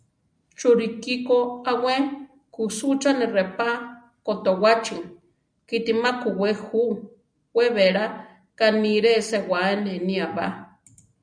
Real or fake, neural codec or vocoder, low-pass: real; none; 9.9 kHz